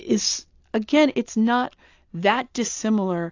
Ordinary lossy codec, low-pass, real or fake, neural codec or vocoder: AAC, 48 kbps; 7.2 kHz; real; none